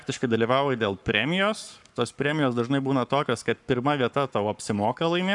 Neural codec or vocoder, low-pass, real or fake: codec, 44.1 kHz, 7.8 kbps, Pupu-Codec; 10.8 kHz; fake